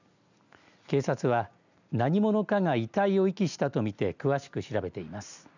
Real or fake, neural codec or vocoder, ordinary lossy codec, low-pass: real; none; none; 7.2 kHz